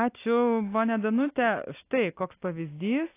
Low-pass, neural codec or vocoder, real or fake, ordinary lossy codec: 3.6 kHz; none; real; AAC, 24 kbps